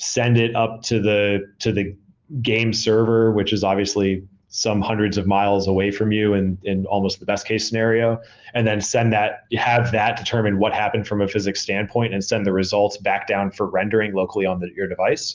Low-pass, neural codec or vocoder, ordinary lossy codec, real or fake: 7.2 kHz; none; Opus, 24 kbps; real